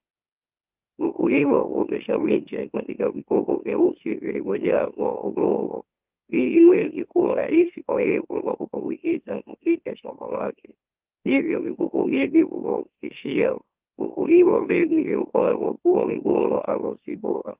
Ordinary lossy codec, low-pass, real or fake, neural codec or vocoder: Opus, 32 kbps; 3.6 kHz; fake; autoencoder, 44.1 kHz, a latent of 192 numbers a frame, MeloTTS